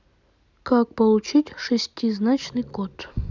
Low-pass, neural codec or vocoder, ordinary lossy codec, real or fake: 7.2 kHz; none; none; real